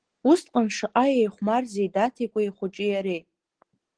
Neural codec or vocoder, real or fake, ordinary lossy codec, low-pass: none; real; Opus, 16 kbps; 9.9 kHz